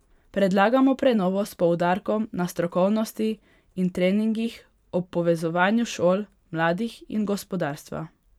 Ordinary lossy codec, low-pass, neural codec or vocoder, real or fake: none; 19.8 kHz; vocoder, 44.1 kHz, 128 mel bands every 512 samples, BigVGAN v2; fake